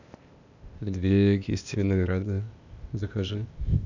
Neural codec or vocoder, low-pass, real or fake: codec, 16 kHz, 0.8 kbps, ZipCodec; 7.2 kHz; fake